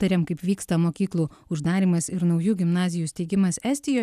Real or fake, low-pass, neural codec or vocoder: real; 14.4 kHz; none